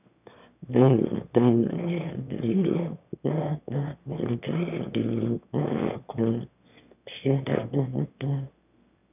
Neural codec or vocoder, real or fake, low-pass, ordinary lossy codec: autoencoder, 22.05 kHz, a latent of 192 numbers a frame, VITS, trained on one speaker; fake; 3.6 kHz; none